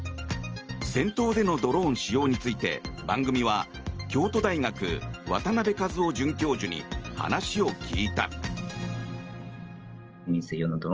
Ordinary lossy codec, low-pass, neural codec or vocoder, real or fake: Opus, 24 kbps; 7.2 kHz; none; real